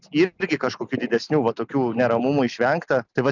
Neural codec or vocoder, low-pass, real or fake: none; 7.2 kHz; real